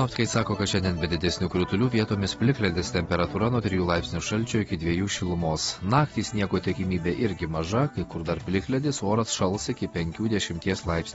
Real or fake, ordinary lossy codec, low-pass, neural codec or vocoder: real; AAC, 24 kbps; 10.8 kHz; none